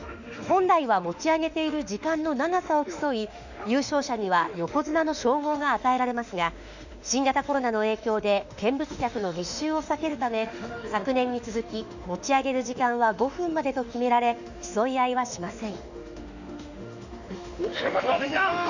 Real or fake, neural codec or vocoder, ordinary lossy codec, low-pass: fake; autoencoder, 48 kHz, 32 numbers a frame, DAC-VAE, trained on Japanese speech; none; 7.2 kHz